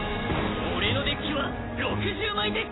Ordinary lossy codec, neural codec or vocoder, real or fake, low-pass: AAC, 16 kbps; none; real; 7.2 kHz